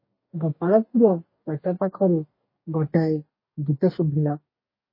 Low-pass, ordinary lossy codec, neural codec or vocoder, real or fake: 5.4 kHz; MP3, 24 kbps; codec, 44.1 kHz, 2.6 kbps, DAC; fake